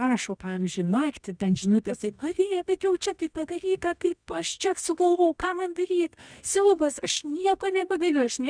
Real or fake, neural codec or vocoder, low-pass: fake; codec, 24 kHz, 0.9 kbps, WavTokenizer, medium music audio release; 9.9 kHz